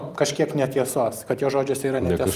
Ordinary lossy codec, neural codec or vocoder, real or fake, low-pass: Opus, 32 kbps; none; real; 14.4 kHz